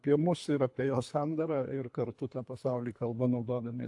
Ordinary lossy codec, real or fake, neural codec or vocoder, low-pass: AAC, 64 kbps; fake; codec, 24 kHz, 3 kbps, HILCodec; 10.8 kHz